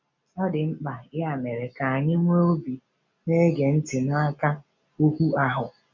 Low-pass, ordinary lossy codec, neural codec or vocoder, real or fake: 7.2 kHz; none; none; real